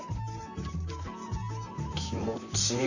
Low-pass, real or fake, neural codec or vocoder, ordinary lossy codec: 7.2 kHz; fake; vocoder, 44.1 kHz, 128 mel bands, Pupu-Vocoder; none